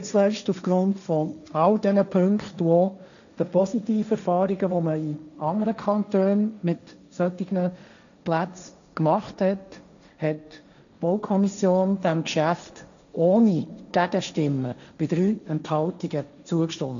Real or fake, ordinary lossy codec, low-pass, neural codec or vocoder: fake; none; 7.2 kHz; codec, 16 kHz, 1.1 kbps, Voila-Tokenizer